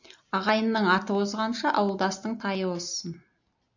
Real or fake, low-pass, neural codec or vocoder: real; 7.2 kHz; none